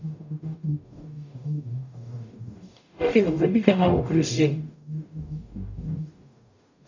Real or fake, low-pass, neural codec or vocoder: fake; 7.2 kHz; codec, 44.1 kHz, 0.9 kbps, DAC